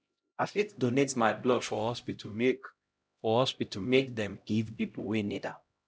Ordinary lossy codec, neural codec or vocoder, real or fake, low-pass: none; codec, 16 kHz, 0.5 kbps, X-Codec, HuBERT features, trained on LibriSpeech; fake; none